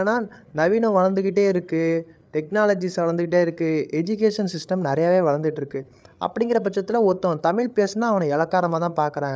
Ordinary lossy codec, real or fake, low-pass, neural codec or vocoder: none; fake; none; codec, 16 kHz, 8 kbps, FreqCodec, larger model